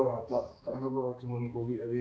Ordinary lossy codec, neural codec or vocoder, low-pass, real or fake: none; codec, 16 kHz, 2 kbps, X-Codec, HuBERT features, trained on balanced general audio; none; fake